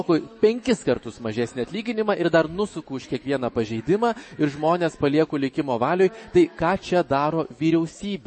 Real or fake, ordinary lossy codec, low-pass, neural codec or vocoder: fake; MP3, 32 kbps; 10.8 kHz; autoencoder, 48 kHz, 128 numbers a frame, DAC-VAE, trained on Japanese speech